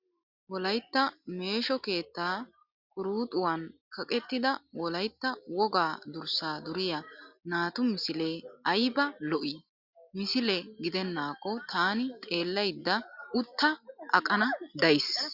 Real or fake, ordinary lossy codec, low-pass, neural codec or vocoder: real; Opus, 64 kbps; 5.4 kHz; none